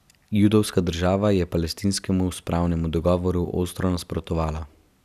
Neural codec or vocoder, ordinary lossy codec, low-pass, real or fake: none; none; 14.4 kHz; real